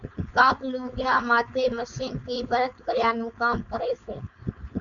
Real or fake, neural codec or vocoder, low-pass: fake; codec, 16 kHz, 4.8 kbps, FACodec; 7.2 kHz